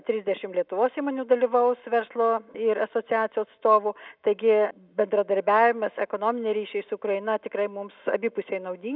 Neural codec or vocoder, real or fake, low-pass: none; real; 5.4 kHz